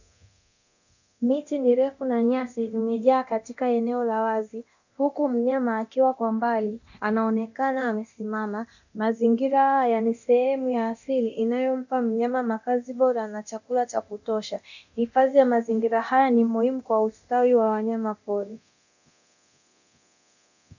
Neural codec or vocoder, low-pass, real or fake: codec, 24 kHz, 0.9 kbps, DualCodec; 7.2 kHz; fake